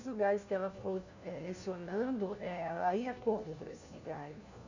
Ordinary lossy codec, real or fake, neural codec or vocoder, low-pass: none; fake; codec, 16 kHz, 1 kbps, FunCodec, trained on LibriTTS, 50 frames a second; 7.2 kHz